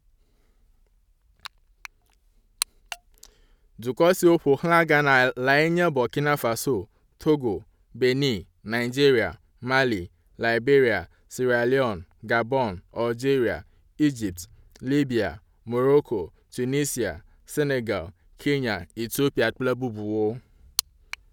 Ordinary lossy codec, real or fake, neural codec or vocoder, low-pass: none; real; none; 19.8 kHz